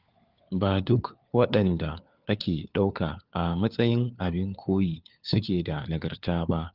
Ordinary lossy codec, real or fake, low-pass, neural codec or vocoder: Opus, 32 kbps; fake; 5.4 kHz; codec, 16 kHz, 4 kbps, FunCodec, trained on LibriTTS, 50 frames a second